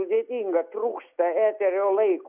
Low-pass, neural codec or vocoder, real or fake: 3.6 kHz; none; real